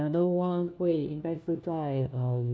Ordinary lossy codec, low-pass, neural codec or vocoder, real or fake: none; none; codec, 16 kHz, 1 kbps, FunCodec, trained on LibriTTS, 50 frames a second; fake